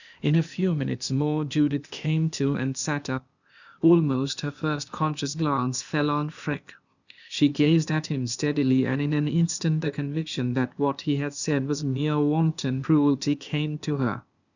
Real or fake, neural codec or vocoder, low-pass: fake; codec, 16 kHz, 0.8 kbps, ZipCodec; 7.2 kHz